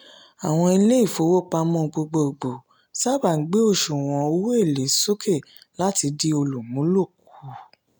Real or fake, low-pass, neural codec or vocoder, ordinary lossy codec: real; none; none; none